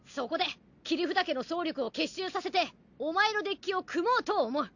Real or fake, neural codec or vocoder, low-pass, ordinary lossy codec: real; none; 7.2 kHz; none